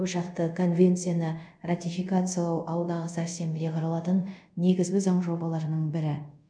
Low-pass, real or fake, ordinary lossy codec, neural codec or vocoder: 9.9 kHz; fake; none; codec, 24 kHz, 0.5 kbps, DualCodec